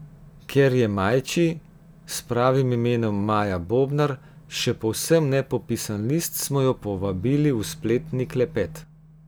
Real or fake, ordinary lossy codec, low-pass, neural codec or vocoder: real; none; none; none